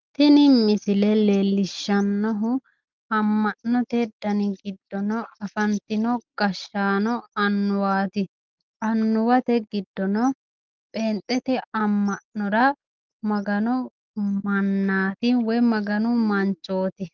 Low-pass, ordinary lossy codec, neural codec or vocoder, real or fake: 7.2 kHz; Opus, 32 kbps; none; real